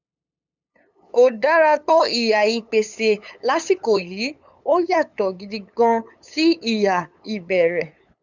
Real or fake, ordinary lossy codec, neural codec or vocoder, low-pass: fake; none; codec, 16 kHz, 8 kbps, FunCodec, trained on LibriTTS, 25 frames a second; 7.2 kHz